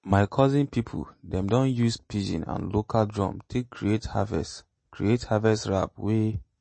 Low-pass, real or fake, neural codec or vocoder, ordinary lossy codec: 9.9 kHz; real; none; MP3, 32 kbps